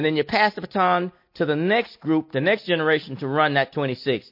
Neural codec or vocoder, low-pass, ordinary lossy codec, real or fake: none; 5.4 kHz; MP3, 32 kbps; real